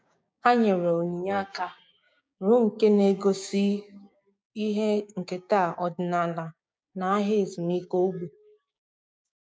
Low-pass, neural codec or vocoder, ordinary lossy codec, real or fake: none; codec, 16 kHz, 6 kbps, DAC; none; fake